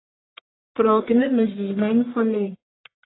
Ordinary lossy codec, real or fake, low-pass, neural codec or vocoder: AAC, 16 kbps; fake; 7.2 kHz; codec, 44.1 kHz, 1.7 kbps, Pupu-Codec